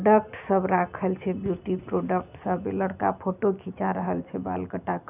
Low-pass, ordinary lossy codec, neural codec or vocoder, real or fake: 3.6 kHz; none; none; real